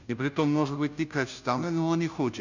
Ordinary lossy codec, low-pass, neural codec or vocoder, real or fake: none; 7.2 kHz; codec, 16 kHz, 0.5 kbps, FunCodec, trained on Chinese and English, 25 frames a second; fake